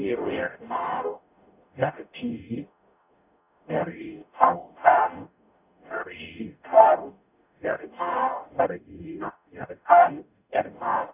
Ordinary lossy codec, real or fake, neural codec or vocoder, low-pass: none; fake; codec, 44.1 kHz, 0.9 kbps, DAC; 3.6 kHz